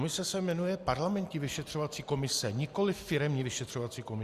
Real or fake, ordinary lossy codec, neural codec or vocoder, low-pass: real; Opus, 64 kbps; none; 14.4 kHz